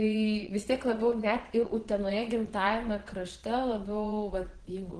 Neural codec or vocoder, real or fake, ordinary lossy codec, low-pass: vocoder, 22.05 kHz, 80 mel bands, Vocos; fake; Opus, 16 kbps; 9.9 kHz